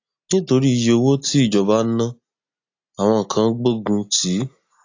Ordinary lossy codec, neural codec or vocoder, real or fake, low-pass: AAC, 48 kbps; none; real; 7.2 kHz